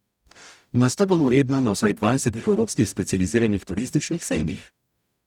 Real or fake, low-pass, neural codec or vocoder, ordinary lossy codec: fake; 19.8 kHz; codec, 44.1 kHz, 0.9 kbps, DAC; none